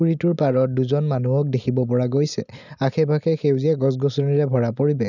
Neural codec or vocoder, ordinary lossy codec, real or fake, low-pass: none; none; real; 7.2 kHz